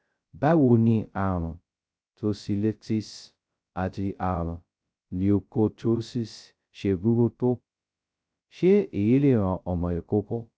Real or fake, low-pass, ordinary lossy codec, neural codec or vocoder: fake; none; none; codec, 16 kHz, 0.2 kbps, FocalCodec